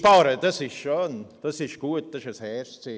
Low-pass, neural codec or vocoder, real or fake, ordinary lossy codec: none; none; real; none